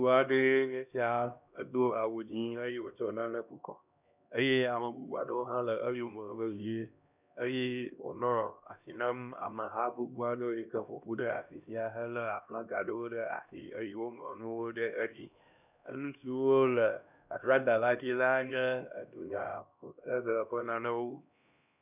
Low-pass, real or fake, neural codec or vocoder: 3.6 kHz; fake; codec, 16 kHz, 1 kbps, X-Codec, HuBERT features, trained on LibriSpeech